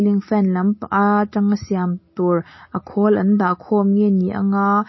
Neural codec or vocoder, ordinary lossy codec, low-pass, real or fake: none; MP3, 24 kbps; 7.2 kHz; real